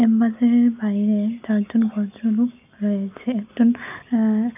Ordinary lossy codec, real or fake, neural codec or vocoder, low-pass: none; real; none; 3.6 kHz